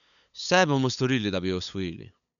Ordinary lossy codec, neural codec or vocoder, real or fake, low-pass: none; codec, 16 kHz, 8 kbps, FunCodec, trained on LibriTTS, 25 frames a second; fake; 7.2 kHz